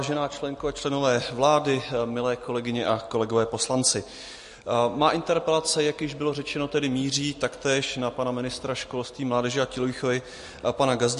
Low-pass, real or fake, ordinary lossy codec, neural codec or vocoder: 10.8 kHz; real; MP3, 48 kbps; none